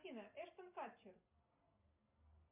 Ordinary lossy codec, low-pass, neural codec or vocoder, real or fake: MP3, 32 kbps; 3.6 kHz; none; real